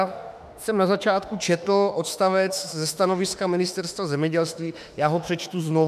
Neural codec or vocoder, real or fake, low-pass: autoencoder, 48 kHz, 32 numbers a frame, DAC-VAE, trained on Japanese speech; fake; 14.4 kHz